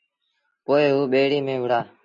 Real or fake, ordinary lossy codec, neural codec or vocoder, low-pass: real; MP3, 96 kbps; none; 7.2 kHz